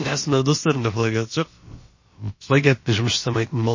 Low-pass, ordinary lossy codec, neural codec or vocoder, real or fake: 7.2 kHz; MP3, 32 kbps; codec, 16 kHz, about 1 kbps, DyCAST, with the encoder's durations; fake